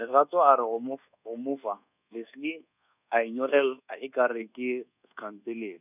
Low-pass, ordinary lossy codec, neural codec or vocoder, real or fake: 3.6 kHz; none; codec, 24 kHz, 1.2 kbps, DualCodec; fake